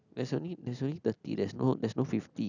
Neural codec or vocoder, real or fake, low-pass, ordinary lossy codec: none; real; 7.2 kHz; none